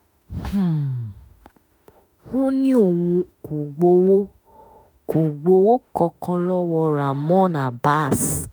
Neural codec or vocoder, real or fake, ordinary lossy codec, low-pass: autoencoder, 48 kHz, 32 numbers a frame, DAC-VAE, trained on Japanese speech; fake; none; none